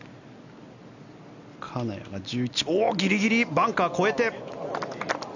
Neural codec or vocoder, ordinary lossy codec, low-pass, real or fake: none; none; 7.2 kHz; real